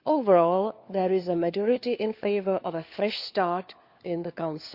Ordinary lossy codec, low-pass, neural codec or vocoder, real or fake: Opus, 64 kbps; 5.4 kHz; codec, 16 kHz, 4 kbps, FunCodec, trained on LibriTTS, 50 frames a second; fake